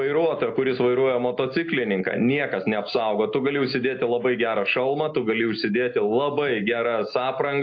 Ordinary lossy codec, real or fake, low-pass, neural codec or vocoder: AAC, 48 kbps; real; 7.2 kHz; none